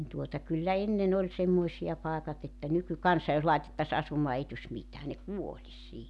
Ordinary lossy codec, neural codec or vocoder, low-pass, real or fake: none; none; none; real